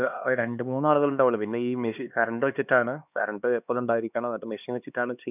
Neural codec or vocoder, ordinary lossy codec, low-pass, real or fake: codec, 16 kHz, 2 kbps, X-Codec, HuBERT features, trained on LibriSpeech; none; 3.6 kHz; fake